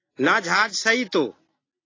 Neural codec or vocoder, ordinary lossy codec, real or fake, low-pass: none; AAC, 32 kbps; real; 7.2 kHz